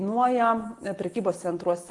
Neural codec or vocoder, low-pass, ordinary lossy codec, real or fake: none; 10.8 kHz; Opus, 24 kbps; real